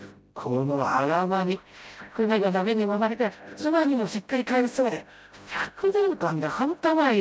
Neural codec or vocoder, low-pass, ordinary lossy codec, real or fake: codec, 16 kHz, 0.5 kbps, FreqCodec, smaller model; none; none; fake